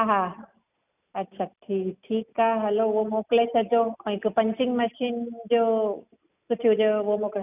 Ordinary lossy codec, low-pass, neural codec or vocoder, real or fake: none; 3.6 kHz; none; real